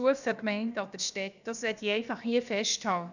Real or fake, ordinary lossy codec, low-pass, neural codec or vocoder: fake; none; 7.2 kHz; codec, 16 kHz, about 1 kbps, DyCAST, with the encoder's durations